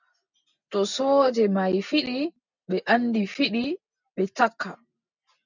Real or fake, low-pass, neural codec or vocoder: real; 7.2 kHz; none